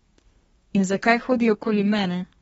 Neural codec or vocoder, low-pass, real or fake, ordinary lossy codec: codec, 32 kHz, 1.9 kbps, SNAC; 14.4 kHz; fake; AAC, 24 kbps